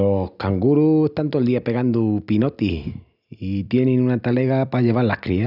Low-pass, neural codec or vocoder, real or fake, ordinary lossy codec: 5.4 kHz; none; real; none